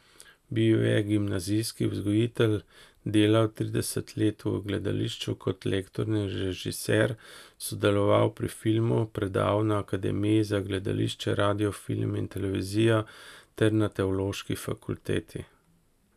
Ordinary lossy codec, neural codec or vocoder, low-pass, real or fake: none; none; 14.4 kHz; real